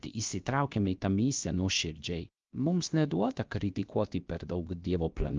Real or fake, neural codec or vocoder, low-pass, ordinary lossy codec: fake; codec, 16 kHz, about 1 kbps, DyCAST, with the encoder's durations; 7.2 kHz; Opus, 24 kbps